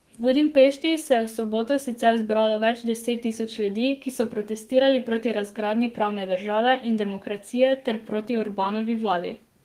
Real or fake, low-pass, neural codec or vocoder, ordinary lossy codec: fake; 14.4 kHz; codec, 32 kHz, 1.9 kbps, SNAC; Opus, 24 kbps